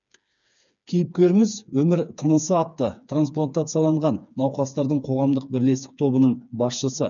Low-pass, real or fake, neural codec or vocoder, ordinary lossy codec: 7.2 kHz; fake; codec, 16 kHz, 4 kbps, FreqCodec, smaller model; none